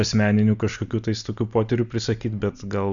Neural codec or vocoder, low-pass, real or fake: none; 7.2 kHz; real